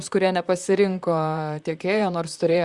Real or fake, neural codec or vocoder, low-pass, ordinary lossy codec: fake; vocoder, 44.1 kHz, 128 mel bands, Pupu-Vocoder; 10.8 kHz; Opus, 64 kbps